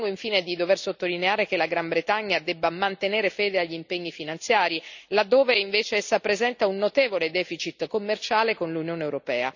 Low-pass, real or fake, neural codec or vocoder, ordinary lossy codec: 7.2 kHz; real; none; none